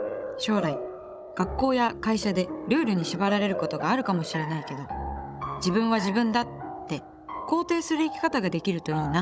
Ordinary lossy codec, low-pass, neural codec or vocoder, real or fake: none; none; codec, 16 kHz, 16 kbps, FunCodec, trained on Chinese and English, 50 frames a second; fake